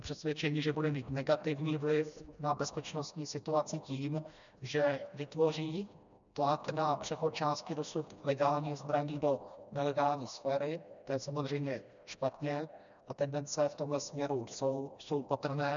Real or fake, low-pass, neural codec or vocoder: fake; 7.2 kHz; codec, 16 kHz, 1 kbps, FreqCodec, smaller model